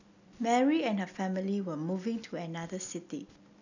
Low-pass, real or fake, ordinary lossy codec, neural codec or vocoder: 7.2 kHz; real; none; none